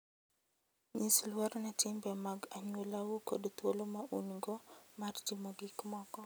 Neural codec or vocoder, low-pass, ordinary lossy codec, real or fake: none; none; none; real